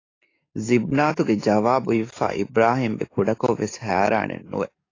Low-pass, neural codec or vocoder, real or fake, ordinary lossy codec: 7.2 kHz; codec, 44.1 kHz, 7.8 kbps, DAC; fake; AAC, 32 kbps